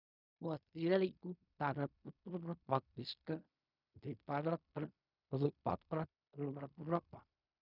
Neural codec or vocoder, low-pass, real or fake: codec, 16 kHz in and 24 kHz out, 0.4 kbps, LongCat-Audio-Codec, fine tuned four codebook decoder; 5.4 kHz; fake